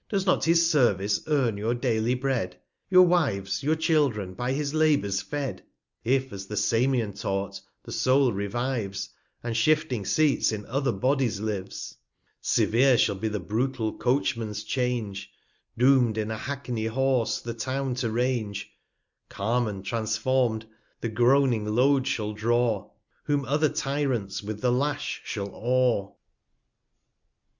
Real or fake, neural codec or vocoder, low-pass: real; none; 7.2 kHz